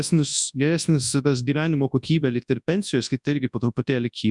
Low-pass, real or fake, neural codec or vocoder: 10.8 kHz; fake; codec, 24 kHz, 0.9 kbps, WavTokenizer, large speech release